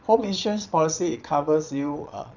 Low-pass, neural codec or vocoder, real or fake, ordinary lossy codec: 7.2 kHz; vocoder, 22.05 kHz, 80 mel bands, Vocos; fake; none